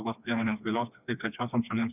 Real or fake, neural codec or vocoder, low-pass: fake; codec, 16 kHz, 2 kbps, FreqCodec, smaller model; 3.6 kHz